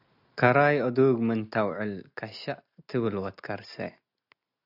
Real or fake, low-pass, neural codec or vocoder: real; 5.4 kHz; none